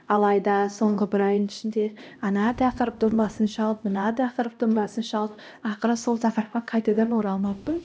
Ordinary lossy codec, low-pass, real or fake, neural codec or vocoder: none; none; fake; codec, 16 kHz, 1 kbps, X-Codec, HuBERT features, trained on LibriSpeech